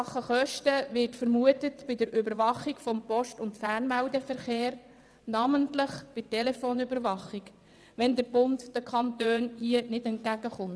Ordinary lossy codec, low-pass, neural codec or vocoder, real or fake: none; none; vocoder, 22.05 kHz, 80 mel bands, WaveNeXt; fake